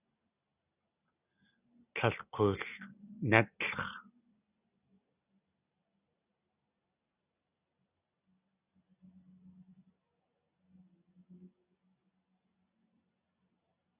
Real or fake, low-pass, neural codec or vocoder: real; 3.6 kHz; none